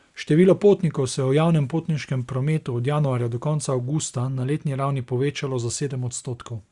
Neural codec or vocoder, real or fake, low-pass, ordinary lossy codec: none; real; 10.8 kHz; Opus, 64 kbps